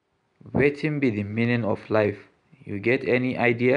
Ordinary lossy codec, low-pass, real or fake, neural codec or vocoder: none; 9.9 kHz; real; none